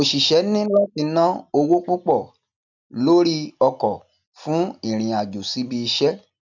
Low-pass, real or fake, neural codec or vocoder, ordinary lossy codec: 7.2 kHz; real; none; none